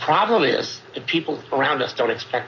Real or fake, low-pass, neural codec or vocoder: real; 7.2 kHz; none